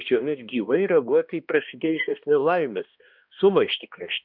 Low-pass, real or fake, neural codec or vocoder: 5.4 kHz; fake; codec, 16 kHz, 1 kbps, X-Codec, HuBERT features, trained on balanced general audio